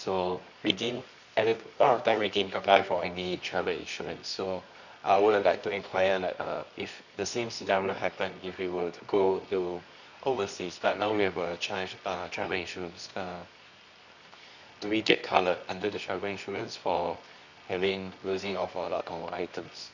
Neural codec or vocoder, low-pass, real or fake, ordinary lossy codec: codec, 24 kHz, 0.9 kbps, WavTokenizer, medium music audio release; 7.2 kHz; fake; none